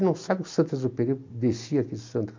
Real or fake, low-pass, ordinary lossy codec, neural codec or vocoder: real; 7.2 kHz; MP3, 48 kbps; none